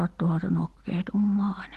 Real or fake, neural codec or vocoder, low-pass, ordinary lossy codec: real; none; 14.4 kHz; Opus, 16 kbps